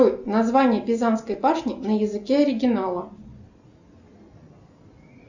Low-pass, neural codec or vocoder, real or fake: 7.2 kHz; none; real